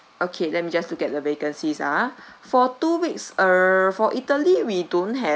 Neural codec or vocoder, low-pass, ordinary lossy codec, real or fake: none; none; none; real